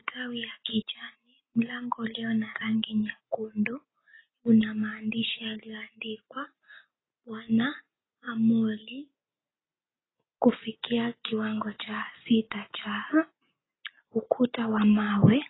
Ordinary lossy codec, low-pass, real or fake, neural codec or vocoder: AAC, 16 kbps; 7.2 kHz; real; none